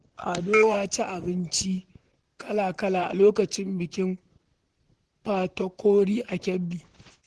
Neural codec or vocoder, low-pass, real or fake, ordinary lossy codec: vocoder, 44.1 kHz, 128 mel bands, Pupu-Vocoder; 10.8 kHz; fake; Opus, 16 kbps